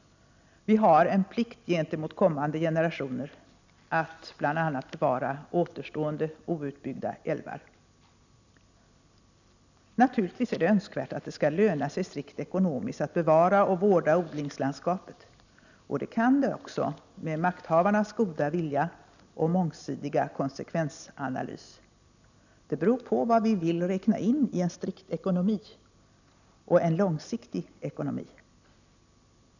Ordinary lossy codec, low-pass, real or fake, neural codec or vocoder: none; 7.2 kHz; real; none